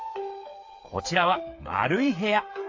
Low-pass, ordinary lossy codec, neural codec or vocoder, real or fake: 7.2 kHz; AAC, 48 kbps; codec, 16 kHz, 8 kbps, FreqCodec, smaller model; fake